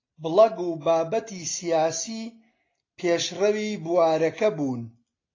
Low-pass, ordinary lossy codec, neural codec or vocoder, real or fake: 7.2 kHz; AAC, 32 kbps; none; real